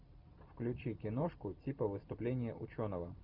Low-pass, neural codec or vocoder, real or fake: 5.4 kHz; vocoder, 44.1 kHz, 128 mel bands every 256 samples, BigVGAN v2; fake